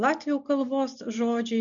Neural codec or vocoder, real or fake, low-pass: none; real; 7.2 kHz